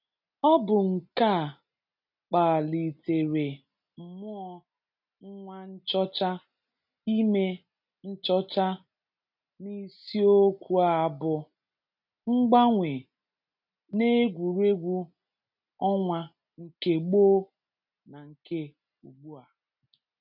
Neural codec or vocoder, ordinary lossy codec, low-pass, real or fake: none; none; 5.4 kHz; real